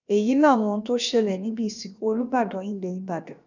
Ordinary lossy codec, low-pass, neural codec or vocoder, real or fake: none; 7.2 kHz; codec, 16 kHz, about 1 kbps, DyCAST, with the encoder's durations; fake